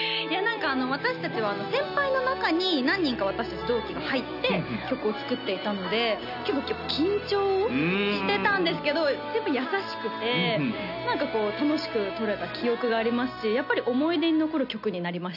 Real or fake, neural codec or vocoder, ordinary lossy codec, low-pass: real; none; none; 5.4 kHz